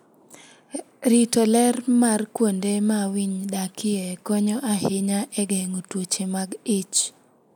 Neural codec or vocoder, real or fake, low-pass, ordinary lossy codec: none; real; none; none